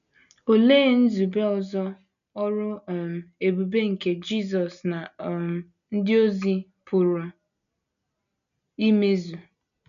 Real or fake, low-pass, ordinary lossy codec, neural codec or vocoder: real; 7.2 kHz; none; none